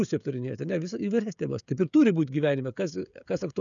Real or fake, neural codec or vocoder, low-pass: fake; codec, 16 kHz, 16 kbps, FreqCodec, smaller model; 7.2 kHz